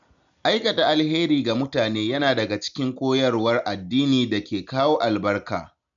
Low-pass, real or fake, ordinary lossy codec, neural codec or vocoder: 7.2 kHz; real; none; none